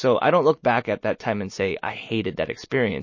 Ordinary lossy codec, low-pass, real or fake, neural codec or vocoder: MP3, 32 kbps; 7.2 kHz; real; none